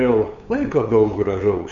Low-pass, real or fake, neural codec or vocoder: 7.2 kHz; fake; codec, 16 kHz, 8 kbps, FunCodec, trained on LibriTTS, 25 frames a second